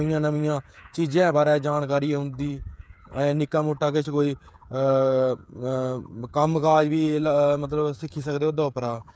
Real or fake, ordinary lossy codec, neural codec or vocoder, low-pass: fake; none; codec, 16 kHz, 8 kbps, FreqCodec, smaller model; none